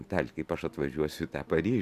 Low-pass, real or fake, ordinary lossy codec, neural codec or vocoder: 14.4 kHz; real; AAC, 96 kbps; none